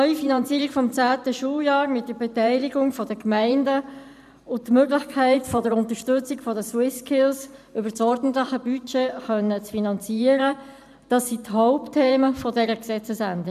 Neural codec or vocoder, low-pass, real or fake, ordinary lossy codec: vocoder, 44.1 kHz, 128 mel bands every 512 samples, BigVGAN v2; 14.4 kHz; fake; none